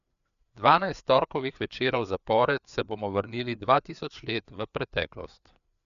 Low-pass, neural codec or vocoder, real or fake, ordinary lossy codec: 7.2 kHz; codec, 16 kHz, 4 kbps, FreqCodec, larger model; fake; none